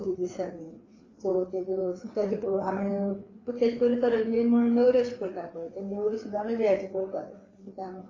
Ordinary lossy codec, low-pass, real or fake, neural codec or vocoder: AAC, 32 kbps; 7.2 kHz; fake; codec, 16 kHz, 4 kbps, FreqCodec, larger model